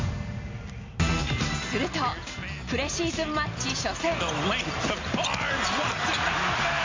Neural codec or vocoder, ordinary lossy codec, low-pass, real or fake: none; MP3, 64 kbps; 7.2 kHz; real